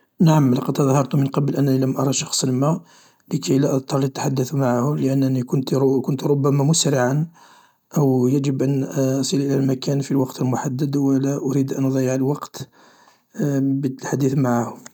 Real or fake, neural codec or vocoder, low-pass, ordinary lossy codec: real; none; 19.8 kHz; none